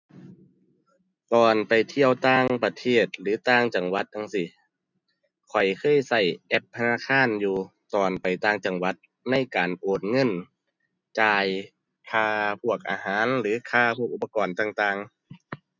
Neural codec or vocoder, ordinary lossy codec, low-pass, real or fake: none; none; 7.2 kHz; real